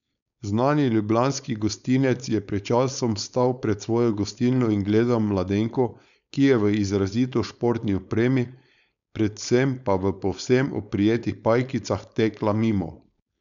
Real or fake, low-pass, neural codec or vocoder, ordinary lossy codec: fake; 7.2 kHz; codec, 16 kHz, 4.8 kbps, FACodec; none